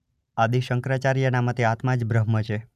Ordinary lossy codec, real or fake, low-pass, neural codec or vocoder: none; real; 14.4 kHz; none